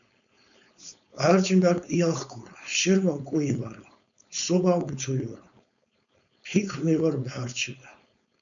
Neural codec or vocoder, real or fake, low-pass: codec, 16 kHz, 4.8 kbps, FACodec; fake; 7.2 kHz